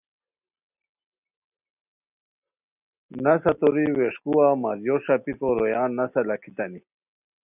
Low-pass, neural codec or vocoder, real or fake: 3.6 kHz; none; real